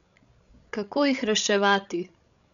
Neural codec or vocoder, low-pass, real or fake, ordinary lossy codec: codec, 16 kHz, 16 kbps, FreqCodec, larger model; 7.2 kHz; fake; none